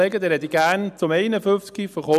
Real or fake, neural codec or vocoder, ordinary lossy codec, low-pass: real; none; none; 14.4 kHz